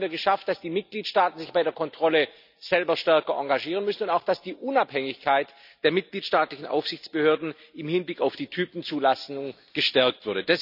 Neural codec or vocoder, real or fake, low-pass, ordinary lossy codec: none; real; 5.4 kHz; none